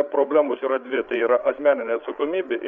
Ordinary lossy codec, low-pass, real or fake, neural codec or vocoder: MP3, 96 kbps; 7.2 kHz; fake; codec, 16 kHz, 8 kbps, FreqCodec, larger model